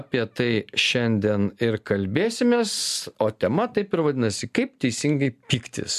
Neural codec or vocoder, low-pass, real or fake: none; 14.4 kHz; real